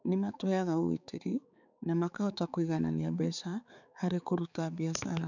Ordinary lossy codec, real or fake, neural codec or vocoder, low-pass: none; fake; codec, 16 kHz, 4 kbps, X-Codec, HuBERT features, trained on balanced general audio; 7.2 kHz